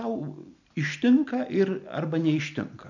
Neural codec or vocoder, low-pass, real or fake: none; 7.2 kHz; real